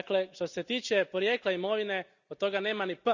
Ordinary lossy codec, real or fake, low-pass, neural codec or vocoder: none; real; 7.2 kHz; none